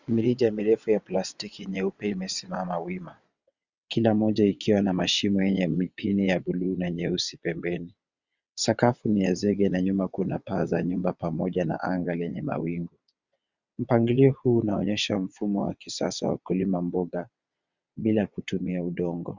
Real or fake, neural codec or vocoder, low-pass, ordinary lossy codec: fake; vocoder, 22.05 kHz, 80 mel bands, WaveNeXt; 7.2 kHz; Opus, 64 kbps